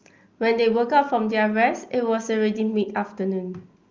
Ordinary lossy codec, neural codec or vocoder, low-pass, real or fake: Opus, 24 kbps; none; 7.2 kHz; real